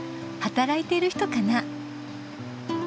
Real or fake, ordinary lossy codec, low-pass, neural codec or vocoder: real; none; none; none